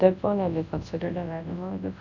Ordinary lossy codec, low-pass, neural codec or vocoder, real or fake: none; 7.2 kHz; codec, 24 kHz, 0.9 kbps, WavTokenizer, large speech release; fake